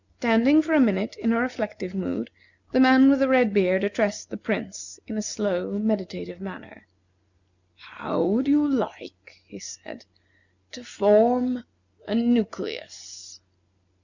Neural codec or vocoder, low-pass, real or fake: none; 7.2 kHz; real